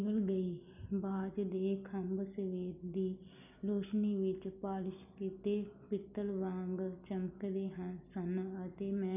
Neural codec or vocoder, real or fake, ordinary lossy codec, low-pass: none; real; none; 3.6 kHz